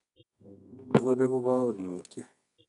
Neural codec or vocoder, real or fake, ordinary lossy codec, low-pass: codec, 24 kHz, 0.9 kbps, WavTokenizer, medium music audio release; fake; none; 10.8 kHz